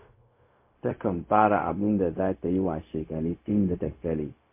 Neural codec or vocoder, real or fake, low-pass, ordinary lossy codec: codec, 16 kHz, 0.4 kbps, LongCat-Audio-Codec; fake; 3.6 kHz; MP3, 24 kbps